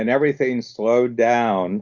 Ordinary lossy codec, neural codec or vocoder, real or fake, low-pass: Opus, 64 kbps; none; real; 7.2 kHz